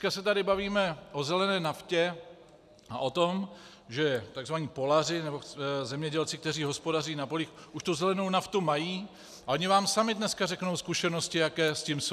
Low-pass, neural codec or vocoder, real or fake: 14.4 kHz; none; real